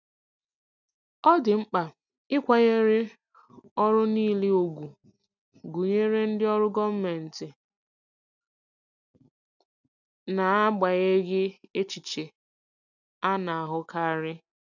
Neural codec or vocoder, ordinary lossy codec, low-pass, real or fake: none; none; 7.2 kHz; real